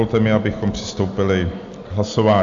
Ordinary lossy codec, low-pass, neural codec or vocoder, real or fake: AAC, 64 kbps; 7.2 kHz; none; real